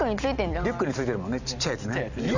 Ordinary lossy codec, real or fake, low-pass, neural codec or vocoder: none; real; 7.2 kHz; none